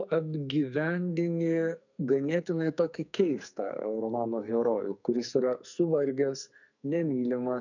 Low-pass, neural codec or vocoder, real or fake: 7.2 kHz; codec, 44.1 kHz, 2.6 kbps, SNAC; fake